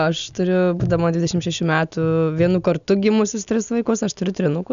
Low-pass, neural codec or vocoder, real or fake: 7.2 kHz; none; real